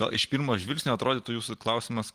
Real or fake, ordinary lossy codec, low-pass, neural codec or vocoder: real; Opus, 24 kbps; 14.4 kHz; none